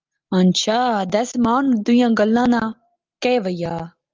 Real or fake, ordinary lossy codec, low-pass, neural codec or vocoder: real; Opus, 32 kbps; 7.2 kHz; none